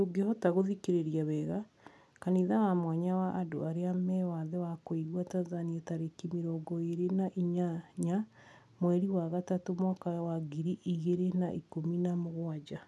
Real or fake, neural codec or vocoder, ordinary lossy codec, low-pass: real; none; none; none